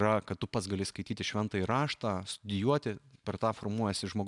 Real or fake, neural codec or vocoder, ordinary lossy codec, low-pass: real; none; MP3, 96 kbps; 10.8 kHz